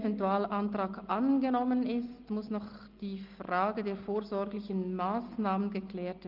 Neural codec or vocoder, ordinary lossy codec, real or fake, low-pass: none; Opus, 32 kbps; real; 5.4 kHz